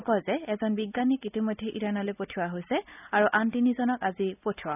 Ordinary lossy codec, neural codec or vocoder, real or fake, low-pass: none; none; real; 3.6 kHz